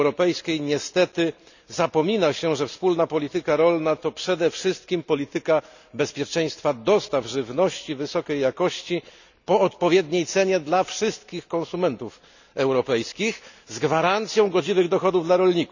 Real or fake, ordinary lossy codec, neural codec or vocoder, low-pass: real; none; none; 7.2 kHz